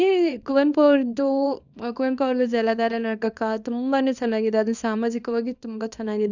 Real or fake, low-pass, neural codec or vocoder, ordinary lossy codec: fake; 7.2 kHz; codec, 24 kHz, 0.9 kbps, WavTokenizer, small release; none